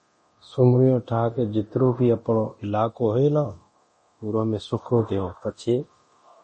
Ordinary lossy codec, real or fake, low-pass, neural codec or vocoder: MP3, 32 kbps; fake; 10.8 kHz; codec, 24 kHz, 0.9 kbps, DualCodec